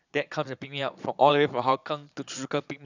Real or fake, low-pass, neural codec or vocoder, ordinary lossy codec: fake; 7.2 kHz; vocoder, 22.05 kHz, 80 mel bands, Vocos; none